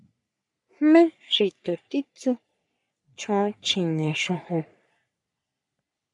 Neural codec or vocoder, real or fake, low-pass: codec, 44.1 kHz, 3.4 kbps, Pupu-Codec; fake; 10.8 kHz